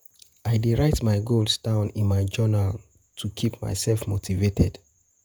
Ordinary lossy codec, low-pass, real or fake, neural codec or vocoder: none; none; fake; vocoder, 48 kHz, 128 mel bands, Vocos